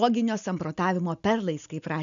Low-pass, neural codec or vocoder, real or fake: 7.2 kHz; none; real